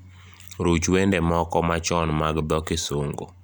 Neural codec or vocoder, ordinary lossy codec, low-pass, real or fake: none; none; none; real